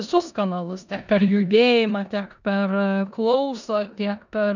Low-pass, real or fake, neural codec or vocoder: 7.2 kHz; fake; codec, 16 kHz in and 24 kHz out, 0.9 kbps, LongCat-Audio-Codec, four codebook decoder